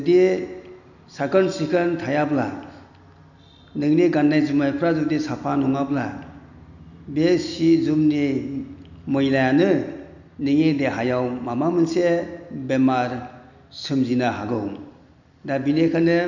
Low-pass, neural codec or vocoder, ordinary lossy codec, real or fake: 7.2 kHz; none; none; real